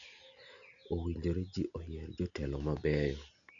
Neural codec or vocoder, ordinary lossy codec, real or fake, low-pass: none; none; real; 7.2 kHz